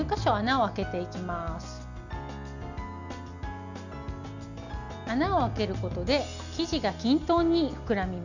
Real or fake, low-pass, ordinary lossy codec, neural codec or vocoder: real; 7.2 kHz; none; none